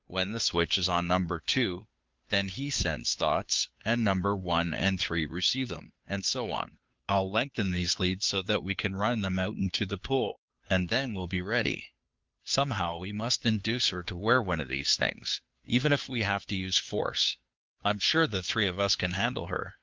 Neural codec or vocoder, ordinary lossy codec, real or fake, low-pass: codec, 16 kHz, 2 kbps, FunCodec, trained on Chinese and English, 25 frames a second; Opus, 32 kbps; fake; 7.2 kHz